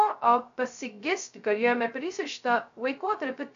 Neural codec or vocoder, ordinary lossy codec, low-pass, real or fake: codec, 16 kHz, 0.2 kbps, FocalCodec; MP3, 64 kbps; 7.2 kHz; fake